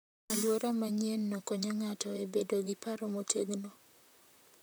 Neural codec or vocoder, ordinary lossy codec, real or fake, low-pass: vocoder, 44.1 kHz, 128 mel bands, Pupu-Vocoder; none; fake; none